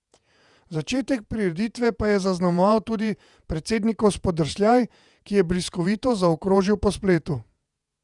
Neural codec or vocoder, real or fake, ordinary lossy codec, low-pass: vocoder, 48 kHz, 128 mel bands, Vocos; fake; none; 10.8 kHz